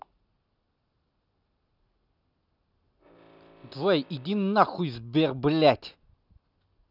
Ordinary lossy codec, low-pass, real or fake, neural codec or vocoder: none; 5.4 kHz; real; none